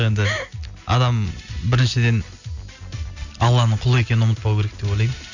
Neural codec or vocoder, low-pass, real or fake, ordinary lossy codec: none; 7.2 kHz; real; none